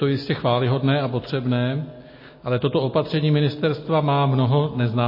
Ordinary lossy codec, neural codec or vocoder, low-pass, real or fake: MP3, 24 kbps; none; 5.4 kHz; real